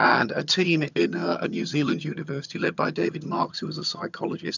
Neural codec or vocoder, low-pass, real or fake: vocoder, 22.05 kHz, 80 mel bands, HiFi-GAN; 7.2 kHz; fake